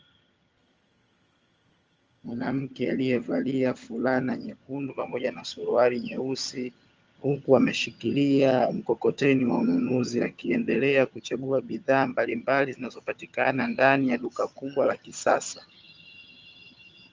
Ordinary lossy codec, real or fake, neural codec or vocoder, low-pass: Opus, 24 kbps; fake; vocoder, 22.05 kHz, 80 mel bands, HiFi-GAN; 7.2 kHz